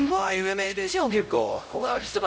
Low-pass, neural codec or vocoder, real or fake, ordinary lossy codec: none; codec, 16 kHz, 0.5 kbps, X-Codec, HuBERT features, trained on LibriSpeech; fake; none